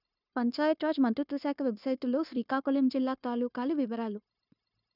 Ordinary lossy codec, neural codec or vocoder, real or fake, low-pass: none; codec, 16 kHz, 0.9 kbps, LongCat-Audio-Codec; fake; 5.4 kHz